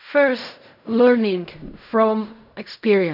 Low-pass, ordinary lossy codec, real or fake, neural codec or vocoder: 5.4 kHz; none; fake; codec, 16 kHz in and 24 kHz out, 0.4 kbps, LongCat-Audio-Codec, fine tuned four codebook decoder